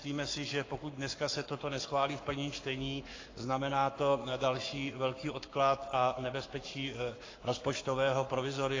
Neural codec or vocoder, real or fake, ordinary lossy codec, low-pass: codec, 44.1 kHz, 7.8 kbps, Pupu-Codec; fake; AAC, 32 kbps; 7.2 kHz